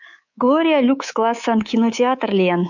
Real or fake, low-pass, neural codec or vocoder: fake; 7.2 kHz; codec, 24 kHz, 3.1 kbps, DualCodec